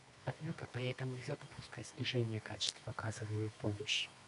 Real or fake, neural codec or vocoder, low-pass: fake; codec, 24 kHz, 0.9 kbps, WavTokenizer, medium music audio release; 10.8 kHz